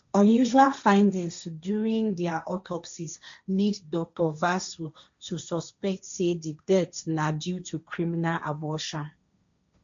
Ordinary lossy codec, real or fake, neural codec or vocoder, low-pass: MP3, 64 kbps; fake; codec, 16 kHz, 1.1 kbps, Voila-Tokenizer; 7.2 kHz